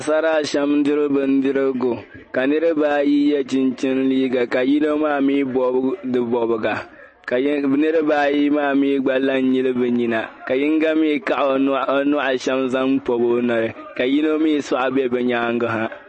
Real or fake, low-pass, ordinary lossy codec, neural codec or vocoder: real; 10.8 kHz; MP3, 32 kbps; none